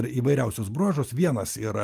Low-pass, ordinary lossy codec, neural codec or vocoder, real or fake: 14.4 kHz; Opus, 32 kbps; none; real